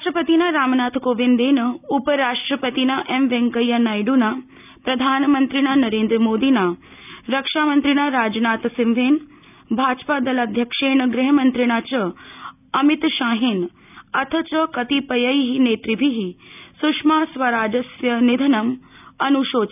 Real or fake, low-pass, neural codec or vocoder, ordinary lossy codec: real; 3.6 kHz; none; none